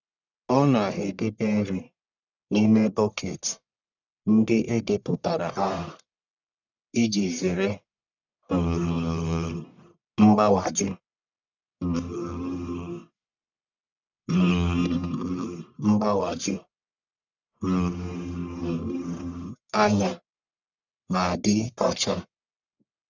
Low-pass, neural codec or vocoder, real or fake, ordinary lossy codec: 7.2 kHz; codec, 44.1 kHz, 1.7 kbps, Pupu-Codec; fake; none